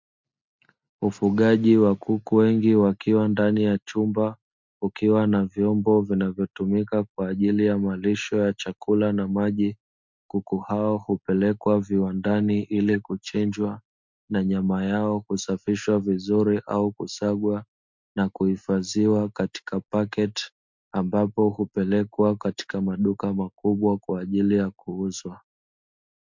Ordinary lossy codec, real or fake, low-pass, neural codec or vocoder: MP3, 64 kbps; real; 7.2 kHz; none